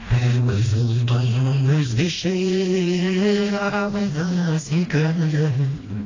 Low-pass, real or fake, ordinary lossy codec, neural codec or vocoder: 7.2 kHz; fake; AAC, 32 kbps; codec, 16 kHz, 1 kbps, FreqCodec, smaller model